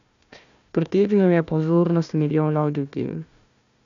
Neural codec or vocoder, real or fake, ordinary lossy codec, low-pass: codec, 16 kHz, 1 kbps, FunCodec, trained on Chinese and English, 50 frames a second; fake; none; 7.2 kHz